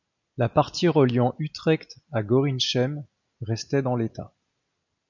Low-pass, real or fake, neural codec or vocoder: 7.2 kHz; real; none